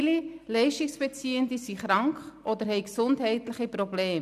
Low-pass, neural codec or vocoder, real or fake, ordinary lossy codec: 14.4 kHz; none; real; none